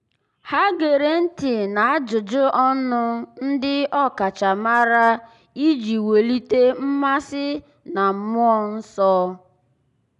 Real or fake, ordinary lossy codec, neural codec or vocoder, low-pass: real; none; none; 10.8 kHz